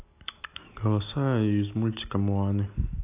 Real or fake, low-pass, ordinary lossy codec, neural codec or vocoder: real; 3.6 kHz; AAC, 24 kbps; none